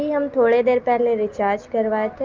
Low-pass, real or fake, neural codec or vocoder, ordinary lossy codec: 7.2 kHz; real; none; Opus, 32 kbps